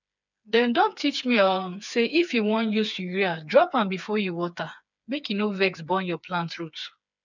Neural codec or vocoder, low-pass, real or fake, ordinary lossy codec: codec, 16 kHz, 4 kbps, FreqCodec, smaller model; 7.2 kHz; fake; none